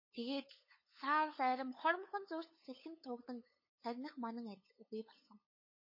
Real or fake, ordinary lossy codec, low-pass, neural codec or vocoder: fake; MP3, 24 kbps; 5.4 kHz; codec, 16 kHz, 8 kbps, FunCodec, trained on LibriTTS, 25 frames a second